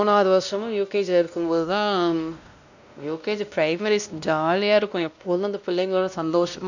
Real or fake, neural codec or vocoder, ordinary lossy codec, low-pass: fake; codec, 16 kHz, 1 kbps, X-Codec, WavLM features, trained on Multilingual LibriSpeech; none; 7.2 kHz